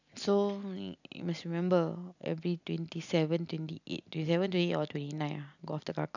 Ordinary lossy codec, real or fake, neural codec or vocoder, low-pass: none; real; none; 7.2 kHz